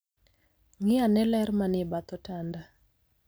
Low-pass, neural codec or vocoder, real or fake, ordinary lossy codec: none; none; real; none